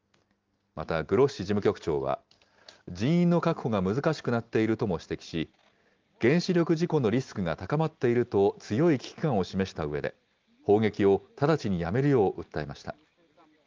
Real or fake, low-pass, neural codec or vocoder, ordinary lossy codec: real; 7.2 kHz; none; Opus, 24 kbps